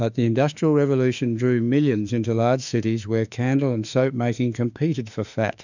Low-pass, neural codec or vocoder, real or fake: 7.2 kHz; autoencoder, 48 kHz, 32 numbers a frame, DAC-VAE, trained on Japanese speech; fake